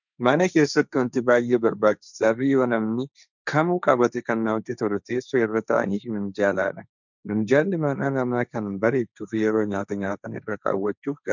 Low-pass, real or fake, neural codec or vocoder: 7.2 kHz; fake; codec, 16 kHz, 1.1 kbps, Voila-Tokenizer